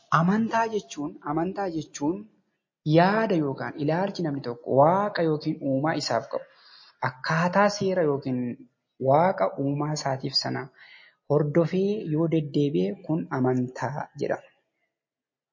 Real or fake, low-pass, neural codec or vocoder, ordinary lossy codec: real; 7.2 kHz; none; MP3, 32 kbps